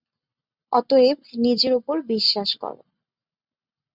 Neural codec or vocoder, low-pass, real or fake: none; 5.4 kHz; real